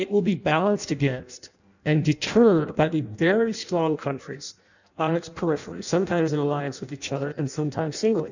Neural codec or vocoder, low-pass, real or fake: codec, 16 kHz in and 24 kHz out, 0.6 kbps, FireRedTTS-2 codec; 7.2 kHz; fake